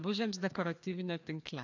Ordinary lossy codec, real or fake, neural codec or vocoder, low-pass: AAC, 48 kbps; fake; codec, 16 kHz, 2 kbps, FreqCodec, larger model; 7.2 kHz